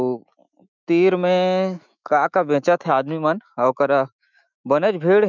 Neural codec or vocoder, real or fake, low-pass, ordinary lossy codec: autoencoder, 48 kHz, 128 numbers a frame, DAC-VAE, trained on Japanese speech; fake; 7.2 kHz; none